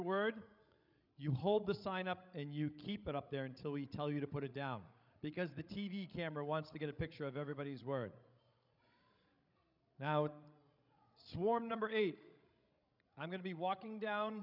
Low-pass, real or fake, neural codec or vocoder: 5.4 kHz; fake; codec, 16 kHz, 16 kbps, FreqCodec, larger model